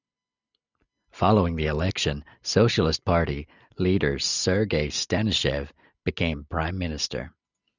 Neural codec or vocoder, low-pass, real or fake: none; 7.2 kHz; real